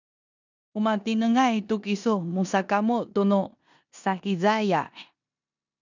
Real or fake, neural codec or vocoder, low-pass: fake; codec, 16 kHz in and 24 kHz out, 0.9 kbps, LongCat-Audio-Codec, four codebook decoder; 7.2 kHz